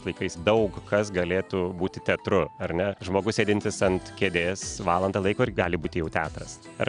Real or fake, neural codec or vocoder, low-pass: real; none; 9.9 kHz